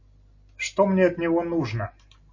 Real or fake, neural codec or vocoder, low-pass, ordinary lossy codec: real; none; 7.2 kHz; MP3, 32 kbps